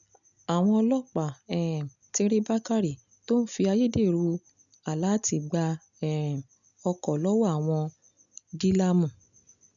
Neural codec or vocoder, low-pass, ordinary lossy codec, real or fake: none; 7.2 kHz; none; real